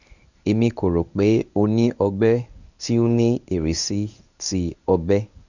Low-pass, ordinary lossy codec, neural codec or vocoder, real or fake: 7.2 kHz; none; codec, 24 kHz, 0.9 kbps, WavTokenizer, medium speech release version 1; fake